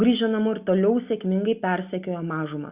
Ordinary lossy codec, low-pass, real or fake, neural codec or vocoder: Opus, 64 kbps; 3.6 kHz; real; none